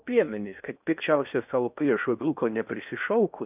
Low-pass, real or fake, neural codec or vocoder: 3.6 kHz; fake; codec, 16 kHz in and 24 kHz out, 0.6 kbps, FocalCodec, streaming, 4096 codes